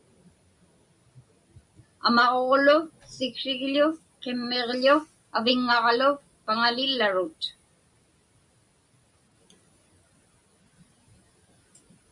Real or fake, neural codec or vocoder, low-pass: real; none; 10.8 kHz